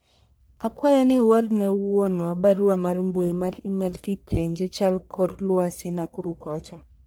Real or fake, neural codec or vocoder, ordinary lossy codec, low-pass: fake; codec, 44.1 kHz, 1.7 kbps, Pupu-Codec; none; none